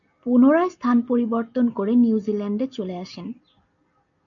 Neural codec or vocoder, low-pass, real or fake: none; 7.2 kHz; real